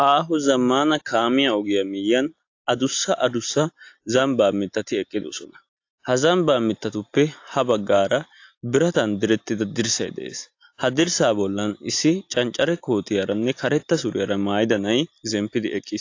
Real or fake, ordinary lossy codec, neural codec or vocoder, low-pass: real; AAC, 48 kbps; none; 7.2 kHz